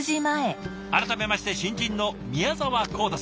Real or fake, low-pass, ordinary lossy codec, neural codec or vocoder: real; none; none; none